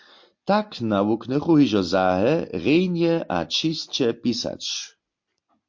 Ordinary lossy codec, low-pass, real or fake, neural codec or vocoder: MP3, 48 kbps; 7.2 kHz; real; none